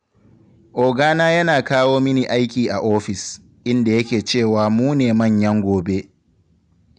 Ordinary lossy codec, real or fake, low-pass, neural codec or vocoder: none; real; 9.9 kHz; none